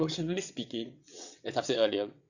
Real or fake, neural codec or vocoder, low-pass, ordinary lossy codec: fake; vocoder, 22.05 kHz, 80 mel bands, WaveNeXt; 7.2 kHz; none